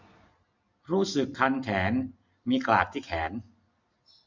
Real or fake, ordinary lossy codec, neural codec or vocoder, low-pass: real; MP3, 64 kbps; none; 7.2 kHz